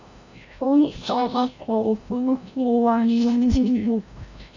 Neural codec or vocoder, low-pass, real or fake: codec, 16 kHz, 0.5 kbps, FreqCodec, larger model; 7.2 kHz; fake